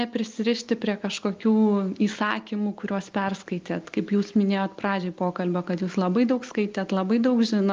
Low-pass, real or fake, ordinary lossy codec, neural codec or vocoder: 7.2 kHz; real; Opus, 32 kbps; none